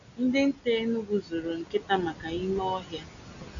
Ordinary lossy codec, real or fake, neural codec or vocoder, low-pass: none; real; none; 7.2 kHz